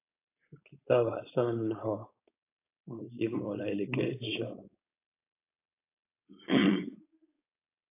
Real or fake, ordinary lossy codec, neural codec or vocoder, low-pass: fake; AAC, 24 kbps; codec, 16 kHz, 4.8 kbps, FACodec; 3.6 kHz